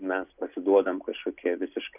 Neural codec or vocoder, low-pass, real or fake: none; 3.6 kHz; real